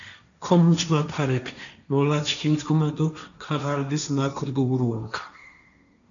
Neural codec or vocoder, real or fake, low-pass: codec, 16 kHz, 1.1 kbps, Voila-Tokenizer; fake; 7.2 kHz